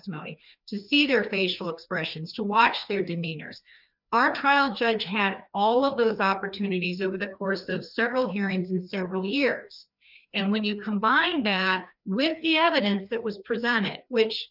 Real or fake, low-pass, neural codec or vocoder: fake; 5.4 kHz; codec, 16 kHz, 2 kbps, FreqCodec, larger model